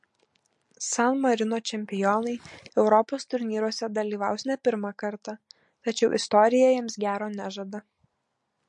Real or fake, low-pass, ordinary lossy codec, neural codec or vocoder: real; 10.8 kHz; MP3, 48 kbps; none